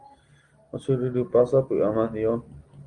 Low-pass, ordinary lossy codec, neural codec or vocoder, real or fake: 9.9 kHz; Opus, 24 kbps; none; real